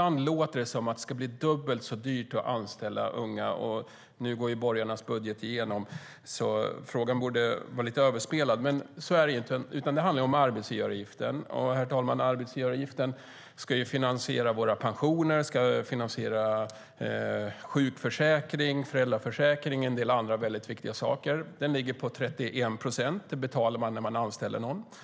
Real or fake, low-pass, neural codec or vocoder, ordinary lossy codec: real; none; none; none